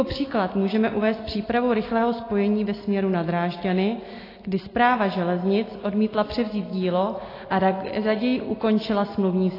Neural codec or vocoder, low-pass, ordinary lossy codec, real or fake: none; 5.4 kHz; AAC, 24 kbps; real